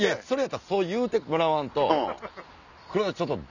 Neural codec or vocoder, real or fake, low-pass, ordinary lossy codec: none; real; 7.2 kHz; none